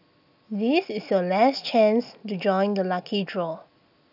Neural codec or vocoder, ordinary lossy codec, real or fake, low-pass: none; none; real; 5.4 kHz